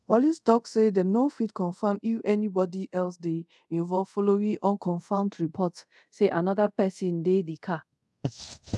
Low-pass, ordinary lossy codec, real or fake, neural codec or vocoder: none; none; fake; codec, 24 kHz, 0.5 kbps, DualCodec